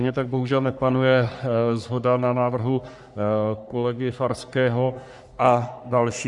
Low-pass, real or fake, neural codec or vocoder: 10.8 kHz; fake; codec, 44.1 kHz, 3.4 kbps, Pupu-Codec